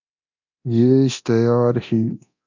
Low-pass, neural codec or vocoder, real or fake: 7.2 kHz; codec, 24 kHz, 0.9 kbps, DualCodec; fake